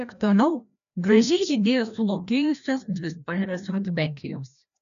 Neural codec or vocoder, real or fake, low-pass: codec, 16 kHz, 1 kbps, FreqCodec, larger model; fake; 7.2 kHz